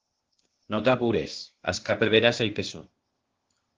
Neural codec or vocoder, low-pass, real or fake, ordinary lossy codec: codec, 16 kHz, 0.8 kbps, ZipCodec; 7.2 kHz; fake; Opus, 16 kbps